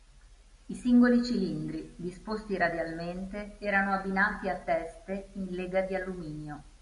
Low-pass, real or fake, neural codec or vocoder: 10.8 kHz; real; none